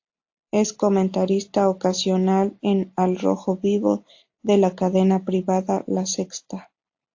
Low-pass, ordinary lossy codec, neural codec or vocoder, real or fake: 7.2 kHz; AAC, 48 kbps; none; real